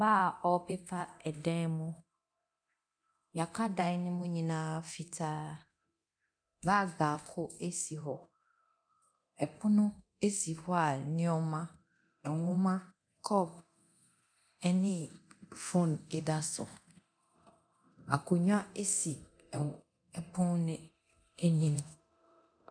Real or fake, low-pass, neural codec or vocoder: fake; 9.9 kHz; codec, 24 kHz, 0.9 kbps, DualCodec